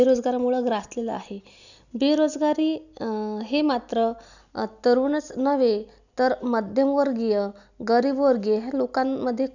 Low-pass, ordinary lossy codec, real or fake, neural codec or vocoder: 7.2 kHz; none; real; none